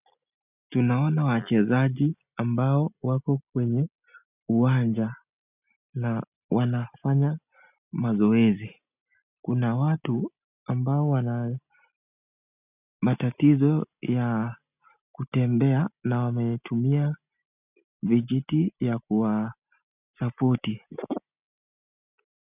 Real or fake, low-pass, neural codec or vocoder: real; 3.6 kHz; none